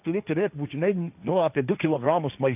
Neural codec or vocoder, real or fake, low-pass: codec, 16 kHz, 1.1 kbps, Voila-Tokenizer; fake; 3.6 kHz